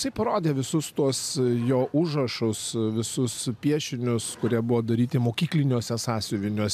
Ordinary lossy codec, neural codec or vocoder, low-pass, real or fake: MP3, 96 kbps; none; 14.4 kHz; real